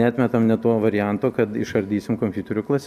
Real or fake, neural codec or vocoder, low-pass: real; none; 14.4 kHz